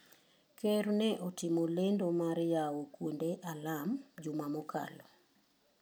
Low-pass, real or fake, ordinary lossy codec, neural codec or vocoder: none; real; none; none